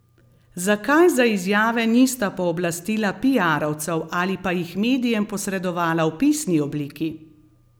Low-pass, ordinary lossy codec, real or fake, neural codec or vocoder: none; none; real; none